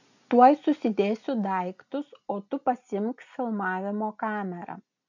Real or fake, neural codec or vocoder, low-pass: real; none; 7.2 kHz